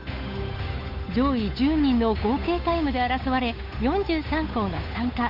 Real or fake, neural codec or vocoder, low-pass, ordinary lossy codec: fake; codec, 16 kHz, 8 kbps, FunCodec, trained on Chinese and English, 25 frames a second; 5.4 kHz; Opus, 64 kbps